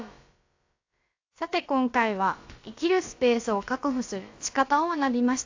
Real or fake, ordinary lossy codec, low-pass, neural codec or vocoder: fake; AAC, 48 kbps; 7.2 kHz; codec, 16 kHz, about 1 kbps, DyCAST, with the encoder's durations